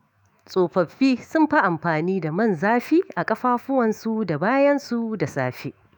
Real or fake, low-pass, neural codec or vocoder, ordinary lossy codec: fake; 19.8 kHz; autoencoder, 48 kHz, 128 numbers a frame, DAC-VAE, trained on Japanese speech; none